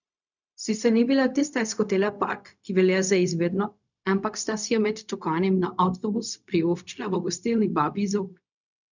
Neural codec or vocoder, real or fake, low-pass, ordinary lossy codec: codec, 16 kHz, 0.4 kbps, LongCat-Audio-Codec; fake; 7.2 kHz; none